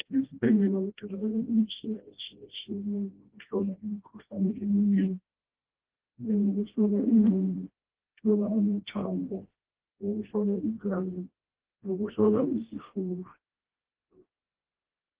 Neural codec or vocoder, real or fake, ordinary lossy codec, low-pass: codec, 16 kHz, 1 kbps, FreqCodec, smaller model; fake; Opus, 16 kbps; 3.6 kHz